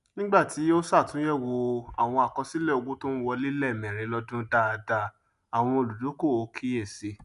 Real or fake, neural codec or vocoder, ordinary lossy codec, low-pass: real; none; none; 10.8 kHz